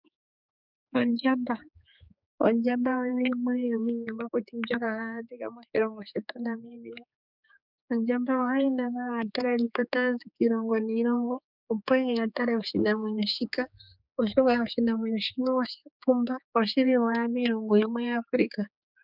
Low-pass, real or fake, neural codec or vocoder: 5.4 kHz; fake; codec, 16 kHz, 4 kbps, X-Codec, HuBERT features, trained on general audio